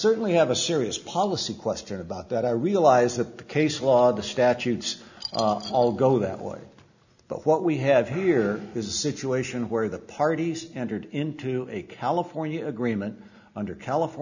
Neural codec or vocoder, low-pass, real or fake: none; 7.2 kHz; real